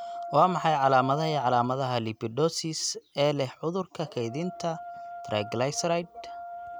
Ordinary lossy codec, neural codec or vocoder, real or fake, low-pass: none; none; real; none